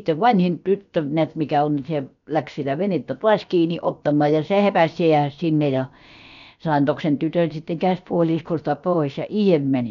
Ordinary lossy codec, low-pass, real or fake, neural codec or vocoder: none; 7.2 kHz; fake; codec, 16 kHz, about 1 kbps, DyCAST, with the encoder's durations